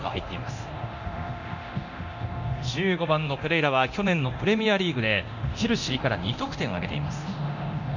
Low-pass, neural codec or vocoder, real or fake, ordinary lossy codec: 7.2 kHz; codec, 24 kHz, 0.9 kbps, DualCodec; fake; none